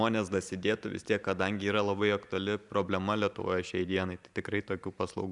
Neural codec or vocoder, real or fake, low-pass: vocoder, 44.1 kHz, 128 mel bands every 512 samples, BigVGAN v2; fake; 10.8 kHz